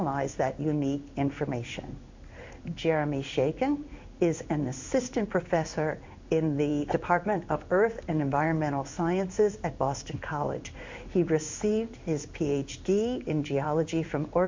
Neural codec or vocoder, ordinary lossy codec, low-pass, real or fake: codec, 16 kHz in and 24 kHz out, 1 kbps, XY-Tokenizer; MP3, 64 kbps; 7.2 kHz; fake